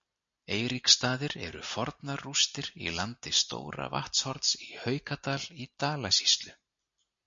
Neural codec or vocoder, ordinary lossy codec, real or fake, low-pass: none; MP3, 48 kbps; real; 7.2 kHz